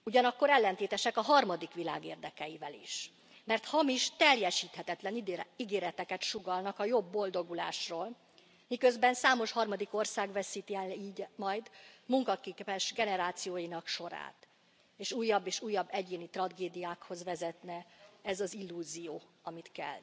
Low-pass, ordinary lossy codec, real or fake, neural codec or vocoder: none; none; real; none